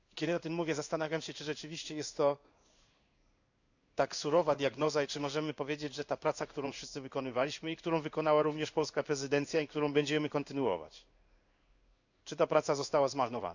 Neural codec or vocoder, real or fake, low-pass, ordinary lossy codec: codec, 16 kHz in and 24 kHz out, 1 kbps, XY-Tokenizer; fake; 7.2 kHz; none